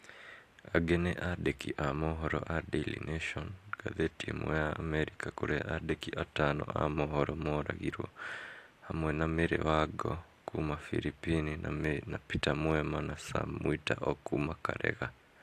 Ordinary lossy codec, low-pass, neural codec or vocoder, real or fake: AAC, 64 kbps; 14.4 kHz; none; real